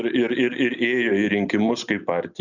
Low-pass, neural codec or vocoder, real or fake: 7.2 kHz; none; real